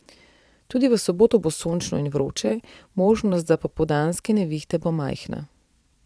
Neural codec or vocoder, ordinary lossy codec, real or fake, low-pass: vocoder, 22.05 kHz, 80 mel bands, Vocos; none; fake; none